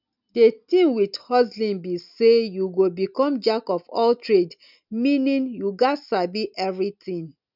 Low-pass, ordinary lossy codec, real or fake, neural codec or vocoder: 5.4 kHz; none; real; none